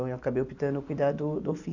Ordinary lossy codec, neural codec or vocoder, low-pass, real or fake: none; none; 7.2 kHz; real